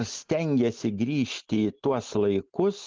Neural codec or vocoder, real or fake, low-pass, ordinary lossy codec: none; real; 7.2 kHz; Opus, 32 kbps